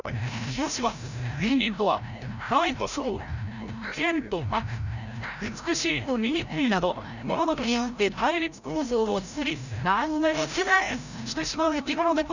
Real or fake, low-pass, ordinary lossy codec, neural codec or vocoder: fake; 7.2 kHz; none; codec, 16 kHz, 0.5 kbps, FreqCodec, larger model